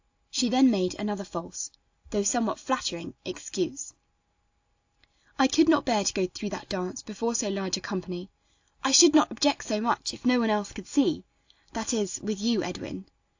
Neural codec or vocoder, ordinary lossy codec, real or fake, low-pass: none; AAC, 48 kbps; real; 7.2 kHz